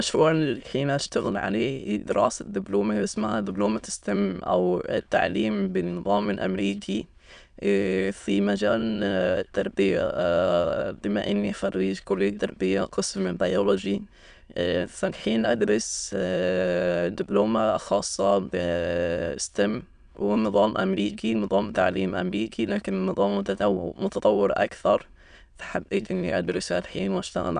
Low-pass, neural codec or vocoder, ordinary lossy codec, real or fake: 9.9 kHz; autoencoder, 22.05 kHz, a latent of 192 numbers a frame, VITS, trained on many speakers; none; fake